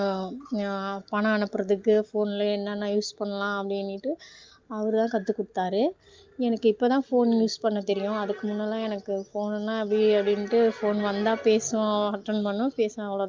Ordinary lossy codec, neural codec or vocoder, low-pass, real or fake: Opus, 32 kbps; none; 7.2 kHz; real